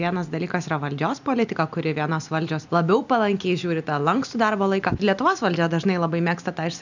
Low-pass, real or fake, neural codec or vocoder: 7.2 kHz; real; none